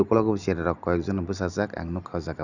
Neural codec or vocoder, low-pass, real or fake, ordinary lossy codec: none; 7.2 kHz; real; none